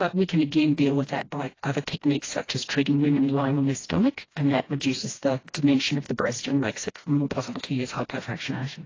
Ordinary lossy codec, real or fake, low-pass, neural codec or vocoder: AAC, 32 kbps; fake; 7.2 kHz; codec, 16 kHz, 1 kbps, FreqCodec, smaller model